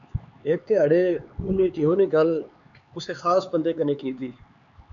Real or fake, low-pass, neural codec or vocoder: fake; 7.2 kHz; codec, 16 kHz, 4 kbps, X-Codec, HuBERT features, trained on LibriSpeech